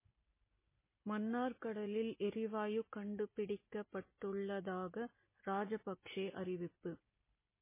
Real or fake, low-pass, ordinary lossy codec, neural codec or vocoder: real; 3.6 kHz; MP3, 16 kbps; none